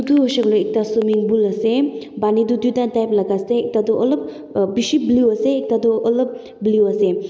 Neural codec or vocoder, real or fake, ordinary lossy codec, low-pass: none; real; none; none